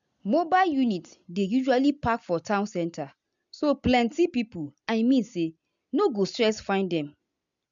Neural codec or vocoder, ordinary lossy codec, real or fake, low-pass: none; MP3, 64 kbps; real; 7.2 kHz